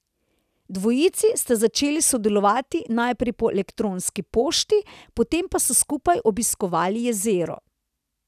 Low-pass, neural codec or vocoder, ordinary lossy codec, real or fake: 14.4 kHz; none; none; real